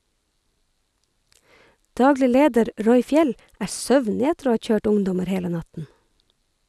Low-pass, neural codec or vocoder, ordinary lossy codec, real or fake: none; none; none; real